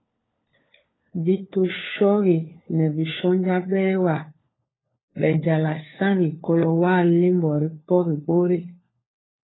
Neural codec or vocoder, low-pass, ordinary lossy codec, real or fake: codec, 16 kHz, 4 kbps, FunCodec, trained on LibriTTS, 50 frames a second; 7.2 kHz; AAC, 16 kbps; fake